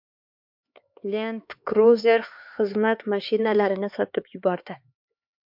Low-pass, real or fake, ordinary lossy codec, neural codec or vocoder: 5.4 kHz; fake; MP3, 48 kbps; codec, 16 kHz, 4 kbps, X-Codec, HuBERT features, trained on LibriSpeech